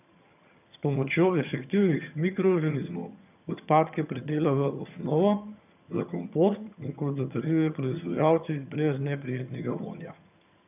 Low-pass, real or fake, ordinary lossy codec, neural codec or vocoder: 3.6 kHz; fake; none; vocoder, 22.05 kHz, 80 mel bands, HiFi-GAN